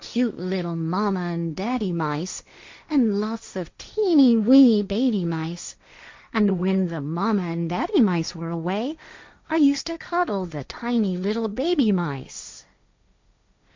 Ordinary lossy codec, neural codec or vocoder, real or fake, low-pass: MP3, 64 kbps; codec, 16 kHz, 1.1 kbps, Voila-Tokenizer; fake; 7.2 kHz